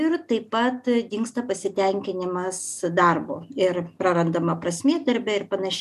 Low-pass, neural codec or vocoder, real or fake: 14.4 kHz; none; real